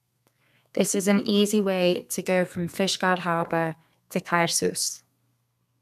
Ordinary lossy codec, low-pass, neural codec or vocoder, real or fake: none; 14.4 kHz; codec, 32 kHz, 1.9 kbps, SNAC; fake